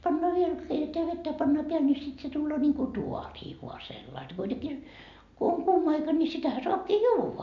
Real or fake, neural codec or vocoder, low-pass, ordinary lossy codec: real; none; 7.2 kHz; MP3, 64 kbps